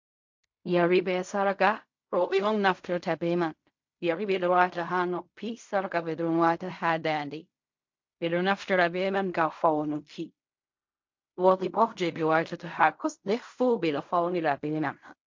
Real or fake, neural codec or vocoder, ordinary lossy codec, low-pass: fake; codec, 16 kHz in and 24 kHz out, 0.4 kbps, LongCat-Audio-Codec, fine tuned four codebook decoder; MP3, 48 kbps; 7.2 kHz